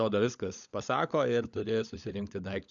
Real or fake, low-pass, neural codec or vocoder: fake; 7.2 kHz; codec, 16 kHz, 16 kbps, FunCodec, trained on LibriTTS, 50 frames a second